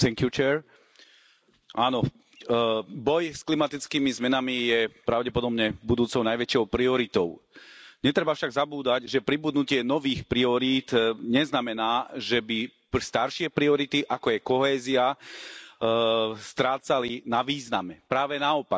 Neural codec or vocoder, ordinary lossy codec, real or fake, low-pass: none; none; real; none